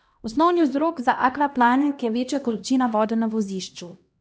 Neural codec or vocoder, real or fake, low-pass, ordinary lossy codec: codec, 16 kHz, 1 kbps, X-Codec, HuBERT features, trained on LibriSpeech; fake; none; none